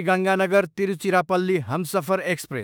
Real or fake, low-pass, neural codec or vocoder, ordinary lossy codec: fake; none; autoencoder, 48 kHz, 32 numbers a frame, DAC-VAE, trained on Japanese speech; none